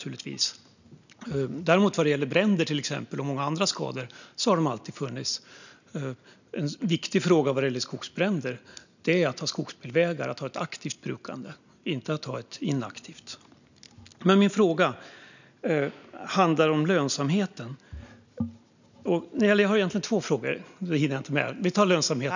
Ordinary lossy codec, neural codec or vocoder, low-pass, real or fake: none; none; 7.2 kHz; real